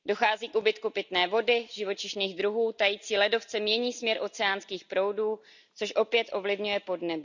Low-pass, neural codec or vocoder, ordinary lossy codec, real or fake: 7.2 kHz; none; none; real